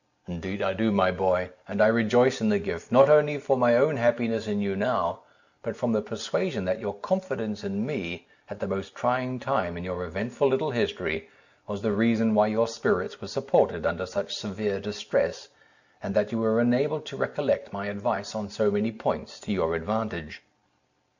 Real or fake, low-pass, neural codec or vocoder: real; 7.2 kHz; none